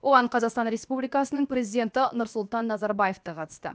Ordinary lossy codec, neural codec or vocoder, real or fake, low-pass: none; codec, 16 kHz, 0.7 kbps, FocalCodec; fake; none